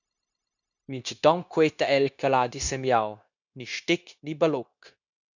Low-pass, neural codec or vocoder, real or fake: 7.2 kHz; codec, 16 kHz, 0.9 kbps, LongCat-Audio-Codec; fake